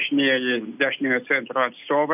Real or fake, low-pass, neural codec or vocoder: real; 3.6 kHz; none